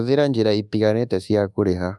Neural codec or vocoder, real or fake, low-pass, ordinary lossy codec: codec, 24 kHz, 1.2 kbps, DualCodec; fake; none; none